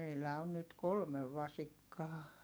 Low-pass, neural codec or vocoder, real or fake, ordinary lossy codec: none; codec, 44.1 kHz, 7.8 kbps, DAC; fake; none